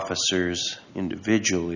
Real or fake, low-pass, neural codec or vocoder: real; 7.2 kHz; none